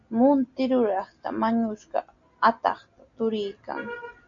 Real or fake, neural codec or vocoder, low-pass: real; none; 7.2 kHz